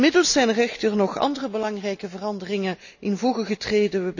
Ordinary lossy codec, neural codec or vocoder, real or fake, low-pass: none; none; real; 7.2 kHz